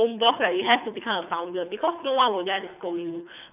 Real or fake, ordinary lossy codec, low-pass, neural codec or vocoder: fake; none; 3.6 kHz; codec, 24 kHz, 3 kbps, HILCodec